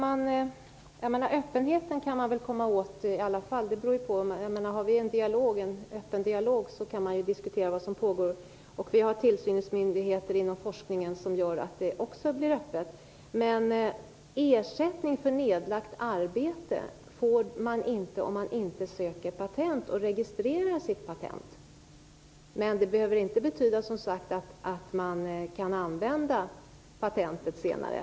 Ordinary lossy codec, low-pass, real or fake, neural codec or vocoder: none; none; real; none